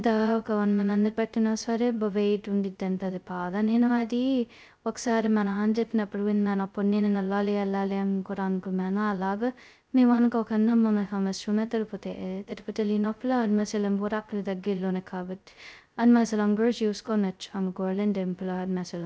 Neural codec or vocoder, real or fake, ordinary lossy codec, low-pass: codec, 16 kHz, 0.2 kbps, FocalCodec; fake; none; none